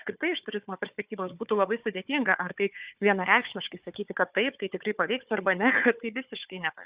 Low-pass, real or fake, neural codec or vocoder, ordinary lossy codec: 3.6 kHz; fake; codec, 16 kHz, 4 kbps, FunCodec, trained on Chinese and English, 50 frames a second; Opus, 64 kbps